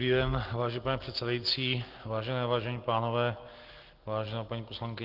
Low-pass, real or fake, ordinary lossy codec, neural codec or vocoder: 5.4 kHz; real; Opus, 16 kbps; none